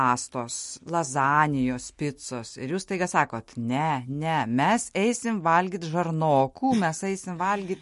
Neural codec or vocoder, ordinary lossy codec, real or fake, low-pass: none; MP3, 48 kbps; real; 14.4 kHz